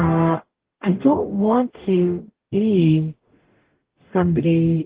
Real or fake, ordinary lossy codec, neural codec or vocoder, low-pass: fake; Opus, 16 kbps; codec, 44.1 kHz, 0.9 kbps, DAC; 3.6 kHz